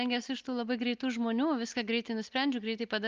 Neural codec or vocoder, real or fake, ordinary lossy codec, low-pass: none; real; Opus, 24 kbps; 7.2 kHz